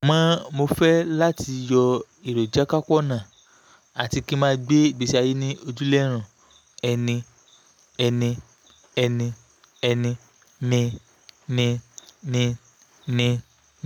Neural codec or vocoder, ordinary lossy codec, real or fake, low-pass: none; none; real; 19.8 kHz